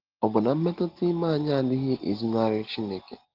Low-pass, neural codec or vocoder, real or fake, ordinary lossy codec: 5.4 kHz; none; real; Opus, 16 kbps